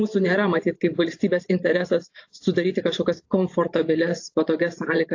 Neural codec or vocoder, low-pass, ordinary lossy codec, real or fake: vocoder, 44.1 kHz, 128 mel bands every 512 samples, BigVGAN v2; 7.2 kHz; AAC, 48 kbps; fake